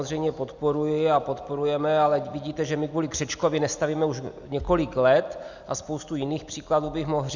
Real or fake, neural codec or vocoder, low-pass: real; none; 7.2 kHz